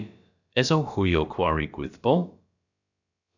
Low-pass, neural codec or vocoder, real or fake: 7.2 kHz; codec, 16 kHz, about 1 kbps, DyCAST, with the encoder's durations; fake